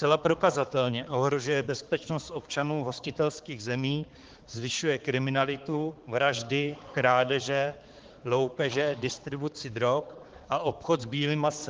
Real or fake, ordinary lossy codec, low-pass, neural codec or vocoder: fake; Opus, 16 kbps; 7.2 kHz; codec, 16 kHz, 4 kbps, X-Codec, HuBERT features, trained on balanced general audio